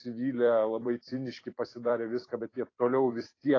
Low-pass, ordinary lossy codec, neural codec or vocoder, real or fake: 7.2 kHz; AAC, 32 kbps; vocoder, 44.1 kHz, 128 mel bands every 256 samples, BigVGAN v2; fake